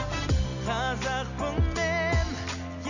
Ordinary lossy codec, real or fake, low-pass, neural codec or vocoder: none; real; 7.2 kHz; none